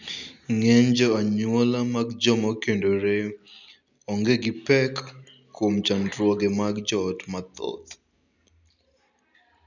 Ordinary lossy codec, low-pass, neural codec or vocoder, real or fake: none; 7.2 kHz; none; real